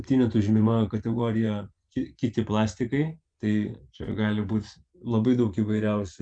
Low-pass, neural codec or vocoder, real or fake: 9.9 kHz; vocoder, 24 kHz, 100 mel bands, Vocos; fake